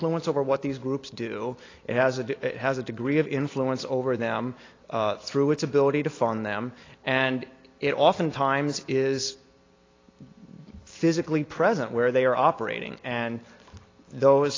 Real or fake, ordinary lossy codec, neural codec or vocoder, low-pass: real; AAC, 32 kbps; none; 7.2 kHz